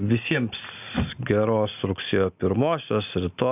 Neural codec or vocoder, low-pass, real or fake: none; 3.6 kHz; real